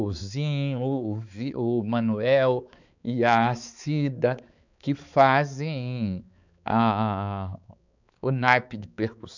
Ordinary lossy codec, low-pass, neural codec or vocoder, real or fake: none; 7.2 kHz; codec, 16 kHz, 4 kbps, X-Codec, HuBERT features, trained on balanced general audio; fake